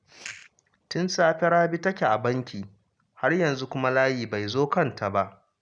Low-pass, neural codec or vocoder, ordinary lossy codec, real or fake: 9.9 kHz; none; none; real